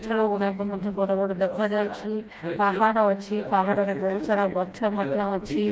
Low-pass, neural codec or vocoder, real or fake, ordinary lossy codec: none; codec, 16 kHz, 1 kbps, FreqCodec, smaller model; fake; none